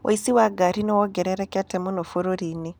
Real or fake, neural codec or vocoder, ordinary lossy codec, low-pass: real; none; none; none